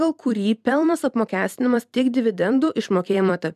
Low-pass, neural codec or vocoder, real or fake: 14.4 kHz; vocoder, 44.1 kHz, 128 mel bands every 256 samples, BigVGAN v2; fake